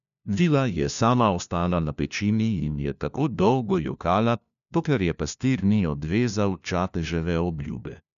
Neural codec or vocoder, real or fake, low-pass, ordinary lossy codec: codec, 16 kHz, 1 kbps, FunCodec, trained on LibriTTS, 50 frames a second; fake; 7.2 kHz; none